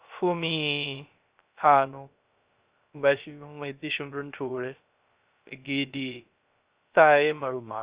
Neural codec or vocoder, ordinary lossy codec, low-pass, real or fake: codec, 16 kHz, 0.3 kbps, FocalCodec; Opus, 64 kbps; 3.6 kHz; fake